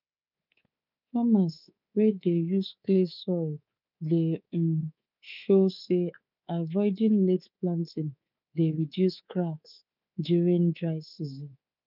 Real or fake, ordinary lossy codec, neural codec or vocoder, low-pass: fake; none; codec, 24 kHz, 3.1 kbps, DualCodec; 5.4 kHz